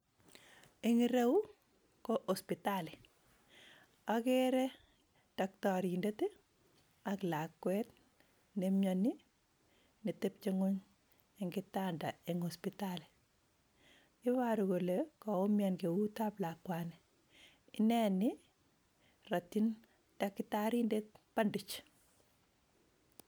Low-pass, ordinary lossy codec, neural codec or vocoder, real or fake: none; none; none; real